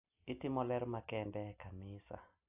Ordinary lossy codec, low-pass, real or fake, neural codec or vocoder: AAC, 32 kbps; 3.6 kHz; real; none